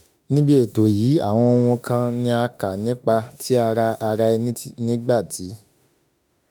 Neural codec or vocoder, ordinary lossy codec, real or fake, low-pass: autoencoder, 48 kHz, 32 numbers a frame, DAC-VAE, trained on Japanese speech; none; fake; none